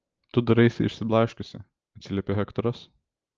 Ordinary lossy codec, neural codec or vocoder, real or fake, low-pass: Opus, 24 kbps; none; real; 7.2 kHz